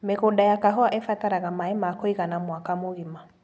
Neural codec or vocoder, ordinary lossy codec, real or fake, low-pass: none; none; real; none